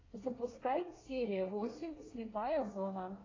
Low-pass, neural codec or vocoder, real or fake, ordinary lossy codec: 7.2 kHz; codec, 24 kHz, 1 kbps, SNAC; fake; AAC, 32 kbps